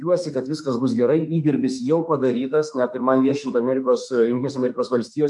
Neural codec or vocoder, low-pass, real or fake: autoencoder, 48 kHz, 32 numbers a frame, DAC-VAE, trained on Japanese speech; 10.8 kHz; fake